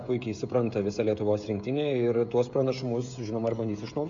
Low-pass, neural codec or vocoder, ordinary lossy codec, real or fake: 7.2 kHz; codec, 16 kHz, 16 kbps, FreqCodec, smaller model; MP3, 64 kbps; fake